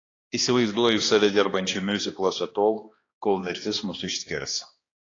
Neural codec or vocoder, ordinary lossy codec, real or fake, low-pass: codec, 16 kHz, 2 kbps, X-Codec, HuBERT features, trained on balanced general audio; AAC, 32 kbps; fake; 7.2 kHz